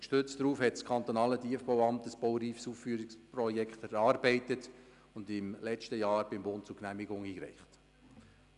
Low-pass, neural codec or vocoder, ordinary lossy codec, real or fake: 10.8 kHz; none; none; real